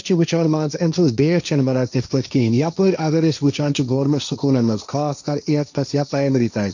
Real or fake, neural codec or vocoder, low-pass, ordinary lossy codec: fake; codec, 16 kHz, 1.1 kbps, Voila-Tokenizer; 7.2 kHz; none